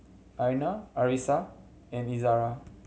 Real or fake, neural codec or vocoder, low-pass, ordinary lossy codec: real; none; none; none